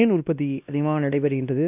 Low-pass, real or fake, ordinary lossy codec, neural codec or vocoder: 3.6 kHz; fake; none; codec, 16 kHz, 1 kbps, X-Codec, WavLM features, trained on Multilingual LibriSpeech